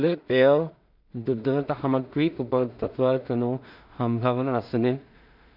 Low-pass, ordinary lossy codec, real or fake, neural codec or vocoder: 5.4 kHz; none; fake; codec, 16 kHz in and 24 kHz out, 0.4 kbps, LongCat-Audio-Codec, two codebook decoder